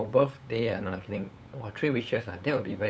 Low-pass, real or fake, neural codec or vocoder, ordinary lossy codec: none; fake; codec, 16 kHz, 8 kbps, FunCodec, trained on LibriTTS, 25 frames a second; none